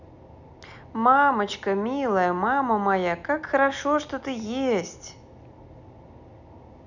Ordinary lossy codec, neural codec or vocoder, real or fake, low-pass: none; none; real; 7.2 kHz